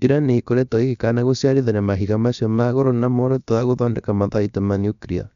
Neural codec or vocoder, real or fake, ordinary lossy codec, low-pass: codec, 16 kHz, about 1 kbps, DyCAST, with the encoder's durations; fake; none; 7.2 kHz